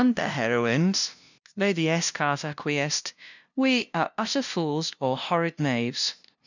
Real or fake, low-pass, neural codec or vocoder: fake; 7.2 kHz; codec, 16 kHz, 0.5 kbps, FunCodec, trained on LibriTTS, 25 frames a second